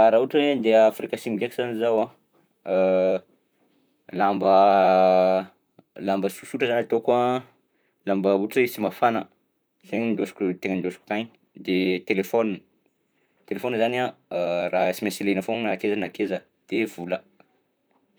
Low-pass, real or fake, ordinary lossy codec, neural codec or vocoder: none; fake; none; vocoder, 44.1 kHz, 128 mel bands, Pupu-Vocoder